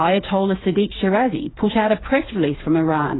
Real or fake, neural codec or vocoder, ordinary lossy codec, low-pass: fake; codec, 16 kHz, 4 kbps, FreqCodec, smaller model; AAC, 16 kbps; 7.2 kHz